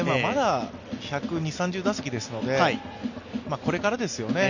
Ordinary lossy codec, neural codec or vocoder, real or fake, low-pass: none; none; real; 7.2 kHz